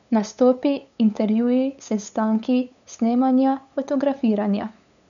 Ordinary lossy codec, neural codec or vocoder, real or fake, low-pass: none; codec, 16 kHz, 8 kbps, FunCodec, trained on LibriTTS, 25 frames a second; fake; 7.2 kHz